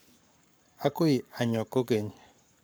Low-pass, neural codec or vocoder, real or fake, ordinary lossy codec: none; codec, 44.1 kHz, 7.8 kbps, Pupu-Codec; fake; none